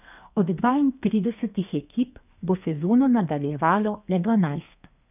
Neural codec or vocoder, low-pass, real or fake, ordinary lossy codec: codec, 32 kHz, 1.9 kbps, SNAC; 3.6 kHz; fake; none